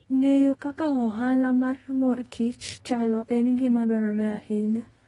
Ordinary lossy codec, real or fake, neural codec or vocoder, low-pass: AAC, 32 kbps; fake; codec, 24 kHz, 0.9 kbps, WavTokenizer, medium music audio release; 10.8 kHz